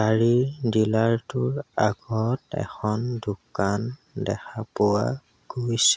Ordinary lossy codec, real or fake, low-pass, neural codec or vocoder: none; real; none; none